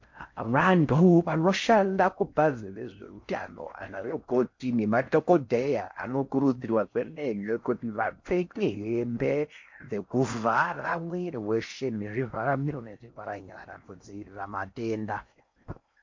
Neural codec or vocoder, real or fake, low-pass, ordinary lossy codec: codec, 16 kHz in and 24 kHz out, 0.6 kbps, FocalCodec, streaming, 4096 codes; fake; 7.2 kHz; MP3, 48 kbps